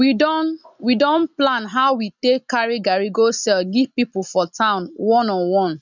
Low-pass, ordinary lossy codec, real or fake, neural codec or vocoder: 7.2 kHz; none; real; none